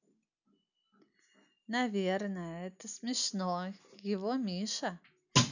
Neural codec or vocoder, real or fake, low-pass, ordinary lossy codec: none; real; 7.2 kHz; none